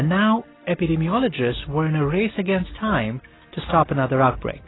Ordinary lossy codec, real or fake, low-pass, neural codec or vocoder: AAC, 16 kbps; real; 7.2 kHz; none